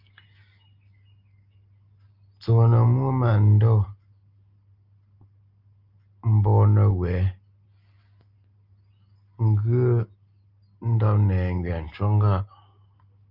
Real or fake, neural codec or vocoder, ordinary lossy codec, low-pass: real; none; Opus, 24 kbps; 5.4 kHz